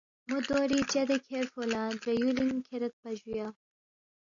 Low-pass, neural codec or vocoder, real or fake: 7.2 kHz; none; real